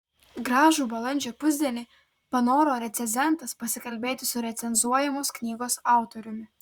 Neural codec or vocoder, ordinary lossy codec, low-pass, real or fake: vocoder, 44.1 kHz, 128 mel bands, Pupu-Vocoder; Opus, 64 kbps; 19.8 kHz; fake